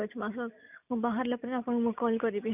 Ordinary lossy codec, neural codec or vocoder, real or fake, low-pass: none; none; real; 3.6 kHz